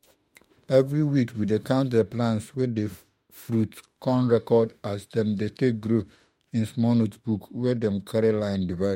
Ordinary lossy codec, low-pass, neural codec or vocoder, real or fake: MP3, 64 kbps; 19.8 kHz; autoencoder, 48 kHz, 32 numbers a frame, DAC-VAE, trained on Japanese speech; fake